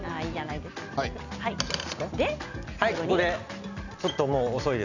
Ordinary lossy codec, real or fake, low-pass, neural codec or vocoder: none; real; 7.2 kHz; none